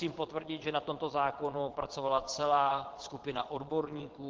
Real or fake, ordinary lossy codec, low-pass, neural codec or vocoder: fake; Opus, 16 kbps; 7.2 kHz; vocoder, 22.05 kHz, 80 mel bands, Vocos